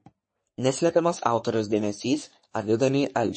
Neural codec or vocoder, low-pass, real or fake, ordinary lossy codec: codec, 44.1 kHz, 3.4 kbps, Pupu-Codec; 9.9 kHz; fake; MP3, 32 kbps